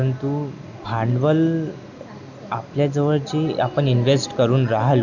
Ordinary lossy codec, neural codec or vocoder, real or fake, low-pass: none; none; real; 7.2 kHz